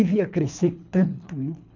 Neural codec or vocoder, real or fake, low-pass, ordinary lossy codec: codec, 24 kHz, 3 kbps, HILCodec; fake; 7.2 kHz; none